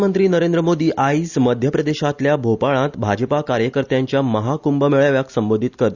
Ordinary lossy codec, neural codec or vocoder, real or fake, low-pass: Opus, 64 kbps; none; real; 7.2 kHz